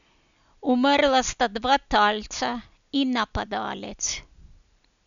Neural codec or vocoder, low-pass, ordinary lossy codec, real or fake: none; 7.2 kHz; none; real